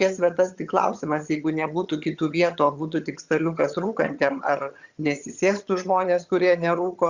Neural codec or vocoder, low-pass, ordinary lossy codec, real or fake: vocoder, 22.05 kHz, 80 mel bands, HiFi-GAN; 7.2 kHz; Opus, 64 kbps; fake